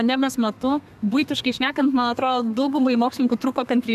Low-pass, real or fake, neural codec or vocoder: 14.4 kHz; fake; codec, 32 kHz, 1.9 kbps, SNAC